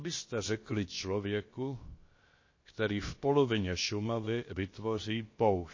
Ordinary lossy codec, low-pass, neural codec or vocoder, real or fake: MP3, 32 kbps; 7.2 kHz; codec, 16 kHz, about 1 kbps, DyCAST, with the encoder's durations; fake